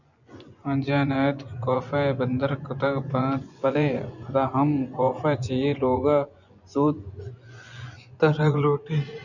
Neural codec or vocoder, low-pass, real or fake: vocoder, 24 kHz, 100 mel bands, Vocos; 7.2 kHz; fake